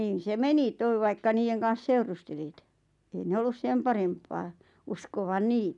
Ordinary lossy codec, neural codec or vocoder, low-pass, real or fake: none; none; 10.8 kHz; real